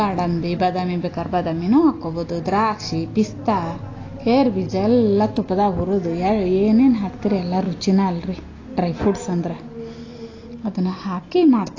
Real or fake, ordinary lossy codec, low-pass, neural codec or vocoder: real; AAC, 32 kbps; 7.2 kHz; none